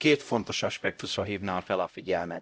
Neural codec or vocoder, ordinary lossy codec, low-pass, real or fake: codec, 16 kHz, 0.5 kbps, X-Codec, HuBERT features, trained on LibriSpeech; none; none; fake